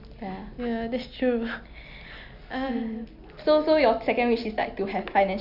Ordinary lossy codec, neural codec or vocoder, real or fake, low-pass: none; none; real; 5.4 kHz